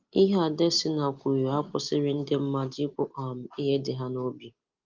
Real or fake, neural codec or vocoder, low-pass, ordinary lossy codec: real; none; 7.2 kHz; Opus, 32 kbps